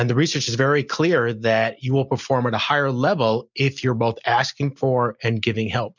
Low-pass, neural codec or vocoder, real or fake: 7.2 kHz; none; real